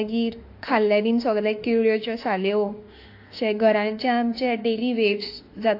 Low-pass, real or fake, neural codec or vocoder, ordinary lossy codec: 5.4 kHz; fake; autoencoder, 48 kHz, 32 numbers a frame, DAC-VAE, trained on Japanese speech; AAC, 32 kbps